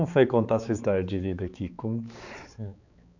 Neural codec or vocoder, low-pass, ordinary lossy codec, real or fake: codec, 16 kHz, 4 kbps, X-Codec, HuBERT features, trained on balanced general audio; 7.2 kHz; none; fake